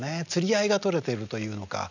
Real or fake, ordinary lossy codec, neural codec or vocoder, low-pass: real; none; none; 7.2 kHz